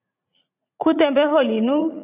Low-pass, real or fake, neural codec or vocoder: 3.6 kHz; fake; vocoder, 44.1 kHz, 80 mel bands, Vocos